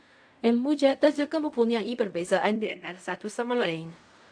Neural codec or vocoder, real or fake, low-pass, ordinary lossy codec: codec, 16 kHz in and 24 kHz out, 0.4 kbps, LongCat-Audio-Codec, fine tuned four codebook decoder; fake; 9.9 kHz; AAC, 64 kbps